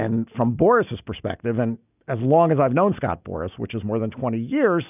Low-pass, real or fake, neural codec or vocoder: 3.6 kHz; real; none